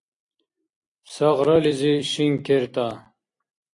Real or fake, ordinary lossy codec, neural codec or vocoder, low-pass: fake; AAC, 48 kbps; vocoder, 44.1 kHz, 128 mel bands every 256 samples, BigVGAN v2; 10.8 kHz